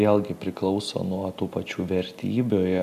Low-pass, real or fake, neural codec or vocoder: 14.4 kHz; real; none